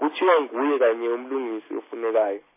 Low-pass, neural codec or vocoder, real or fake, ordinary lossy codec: 3.6 kHz; none; real; MP3, 16 kbps